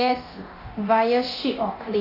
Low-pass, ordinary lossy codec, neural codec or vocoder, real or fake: 5.4 kHz; AAC, 32 kbps; codec, 24 kHz, 0.9 kbps, DualCodec; fake